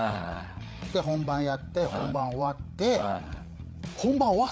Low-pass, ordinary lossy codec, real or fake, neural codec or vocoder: none; none; fake; codec, 16 kHz, 8 kbps, FreqCodec, larger model